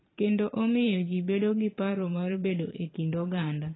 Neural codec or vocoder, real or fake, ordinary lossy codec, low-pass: vocoder, 44.1 kHz, 128 mel bands every 512 samples, BigVGAN v2; fake; AAC, 16 kbps; 7.2 kHz